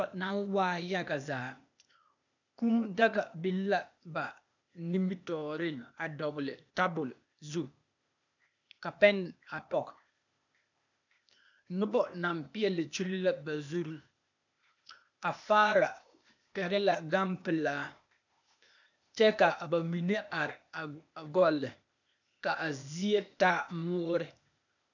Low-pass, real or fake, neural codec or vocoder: 7.2 kHz; fake; codec, 16 kHz, 0.8 kbps, ZipCodec